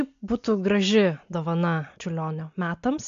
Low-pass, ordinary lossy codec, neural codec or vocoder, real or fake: 7.2 kHz; AAC, 64 kbps; none; real